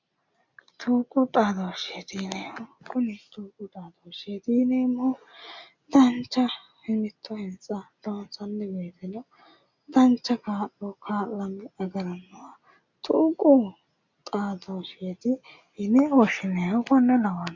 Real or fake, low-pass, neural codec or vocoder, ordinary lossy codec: real; 7.2 kHz; none; MP3, 64 kbps